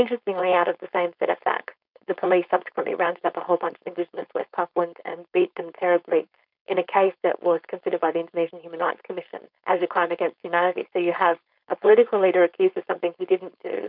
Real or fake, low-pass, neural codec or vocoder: fake; 5.4 kHz; codec, 16 kHz, 4.8 kbps, FACodec